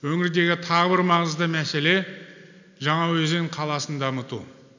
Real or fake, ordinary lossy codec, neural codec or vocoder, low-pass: real; none; none; 7.2 kHz